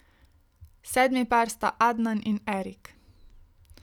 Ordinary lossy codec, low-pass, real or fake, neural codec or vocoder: none; 19.8 kHz; real; none